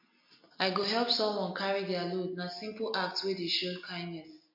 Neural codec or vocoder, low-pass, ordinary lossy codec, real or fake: none; 5.4 kHz; MP3, 32 kbps; real